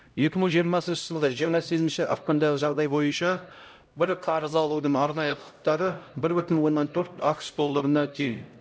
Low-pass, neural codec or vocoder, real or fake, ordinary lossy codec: none; codec, 16 kHz, 0.5 kbps, X-Codec, HuBERT features, trained on LibriSpeech; fake; none